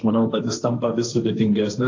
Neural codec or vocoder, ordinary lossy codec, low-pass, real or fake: codec, 16 kHz, 1.1 kbps, Voila-Tokenizer; AAC, 48 kbps; 7.2 kHz; fake